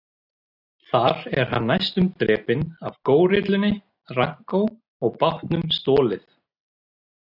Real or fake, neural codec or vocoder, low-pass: real; none; 5.4 kHz